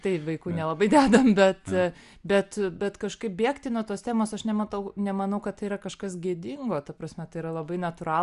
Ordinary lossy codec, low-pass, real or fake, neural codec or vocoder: Opus, 64 kbps; 10.8 kHz; real; none